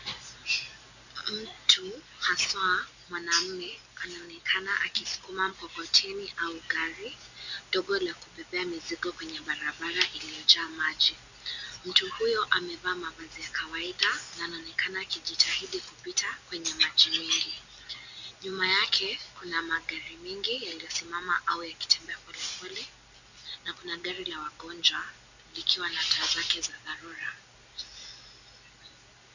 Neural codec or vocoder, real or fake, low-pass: none; real; 7.2 kHz